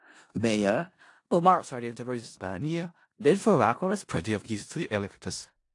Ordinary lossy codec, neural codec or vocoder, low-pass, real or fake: AAC, 48 kbps; codec, 16 kHz in and 24 kHz out, 0.4 kbps, LongCat-Audio-Codec, four codebook decoder; 10.8 kHz; fake